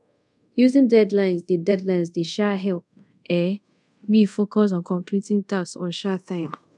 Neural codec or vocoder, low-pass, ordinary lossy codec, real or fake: codec, 24 kHz, 0.5 kbps, DualCodec; 10.8 kHz; none; fake